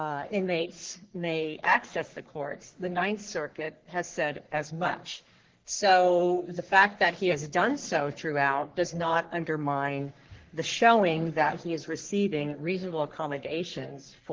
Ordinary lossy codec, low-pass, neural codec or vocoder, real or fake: Opus, 16 kbps; 7.2 kHz; codec, 44.1 kHz, 3.4 kbps, Pupu-Codec; fake